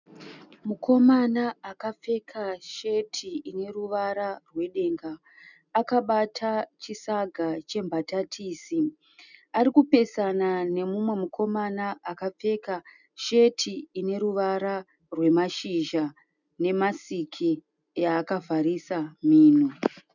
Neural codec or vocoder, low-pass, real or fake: none; 7.2 kHz; real